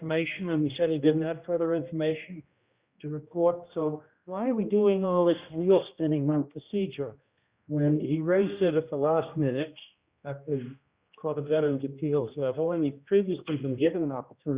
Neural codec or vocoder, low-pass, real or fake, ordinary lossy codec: codec, 16 kHz, 1 kbps, X-Codec, HuBERT features, trained on general audio; 3.6 kHz; fake; Opus, 64 kbps